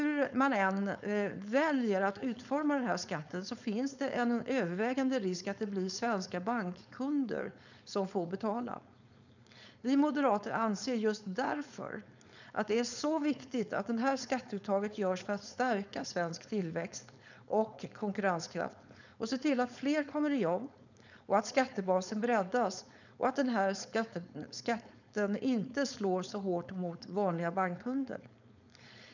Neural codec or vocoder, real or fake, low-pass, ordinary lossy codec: codec, 16 kHz, 4.8 kbps, FACodec; fake; 7.2 kHz; none